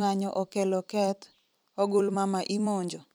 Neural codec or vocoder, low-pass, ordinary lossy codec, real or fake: vocoder, 44.1 kHz, 128 mel bands every 256 samples, BigVGAN v2; none; none; fake